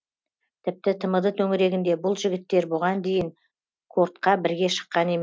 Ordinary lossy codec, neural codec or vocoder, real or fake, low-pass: none; none; real; none